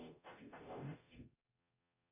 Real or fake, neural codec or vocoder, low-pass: fake; codec, 44.1 kHz, 0.9 kbps, DAC; 3.6 kHz